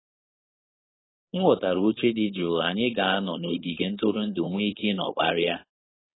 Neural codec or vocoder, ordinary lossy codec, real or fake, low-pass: codec, 16 kHz, 4.8 kbps, FACodec; AAC, 16 kbps; fake; 7.2 kHz